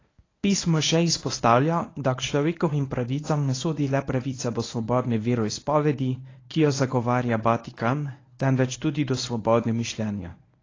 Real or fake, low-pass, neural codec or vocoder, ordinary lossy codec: fake; 7.2 kHz; codec, 24 kHz, 0.9 kbps, WavTokenizer, medium speech release version 1; AAC, 32 kbps